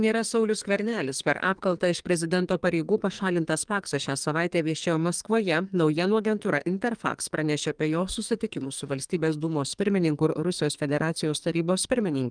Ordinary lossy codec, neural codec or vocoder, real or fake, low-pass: Opus, 32 kbps; codec, 44.1 kHz, 2.6 kbps, SNAC; fake; 9.9 kHz